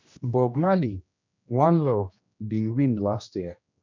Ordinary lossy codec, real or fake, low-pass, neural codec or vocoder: MP3, 64 kbps; fake; 7.2 kHz; codec, 16 kHz, 1 kbps, X-Codec, HuBERT features, trained on general audio